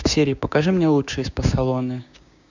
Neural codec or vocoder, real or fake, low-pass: codec, 16 kHz, 6 kbps, DAC; fake; 7.2 kHz